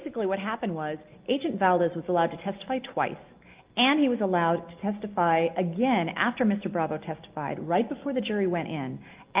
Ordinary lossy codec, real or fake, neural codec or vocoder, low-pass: Opus, 32 kbps; real; none; 3.6 kHz